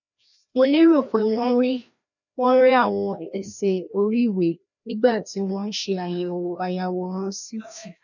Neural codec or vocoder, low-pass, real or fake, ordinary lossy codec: codec, 16 kHz, 1 kbps, FreqCodec, larger model; 7.2 kHz; fake; none